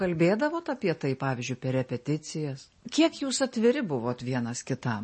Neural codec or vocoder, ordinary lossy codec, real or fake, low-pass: none; MP3, 32 kbps; real; 10.8 kHz